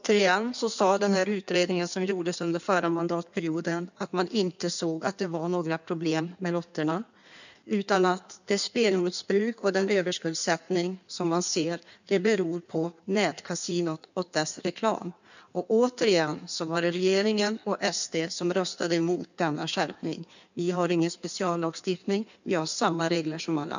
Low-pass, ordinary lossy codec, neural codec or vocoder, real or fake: 7.2 kHz; none; codec, 16 kHz in and 24 kHz out, 1.1 kbps, FireRedTTS-2 codec; fake